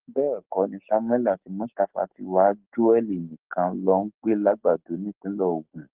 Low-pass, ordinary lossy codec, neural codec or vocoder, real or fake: 3.6 kHz; Opus, 16 kbps; codec, 44.1 kHz, 7.8 kbps, Pupu-Codec; fake